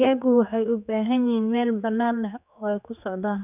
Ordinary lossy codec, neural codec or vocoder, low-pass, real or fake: none; codec, 16 kHz, 4 kbps, X-Codec, HuBERT features, trained on general audio; 3.6 kHz; fake